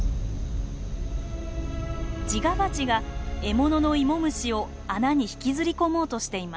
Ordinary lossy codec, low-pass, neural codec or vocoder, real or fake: none; none; none; real